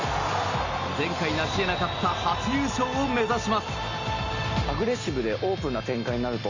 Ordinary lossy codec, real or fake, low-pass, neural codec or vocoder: Opus, 64 kbps; real; 7.2 kHz; none